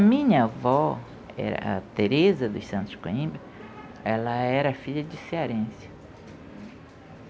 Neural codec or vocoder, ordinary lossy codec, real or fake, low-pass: none; none; real; none